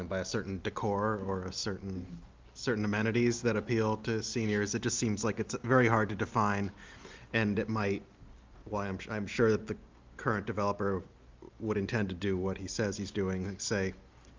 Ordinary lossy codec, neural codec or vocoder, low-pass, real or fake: Opus, 24 kbps; none; 7.2 kHz; real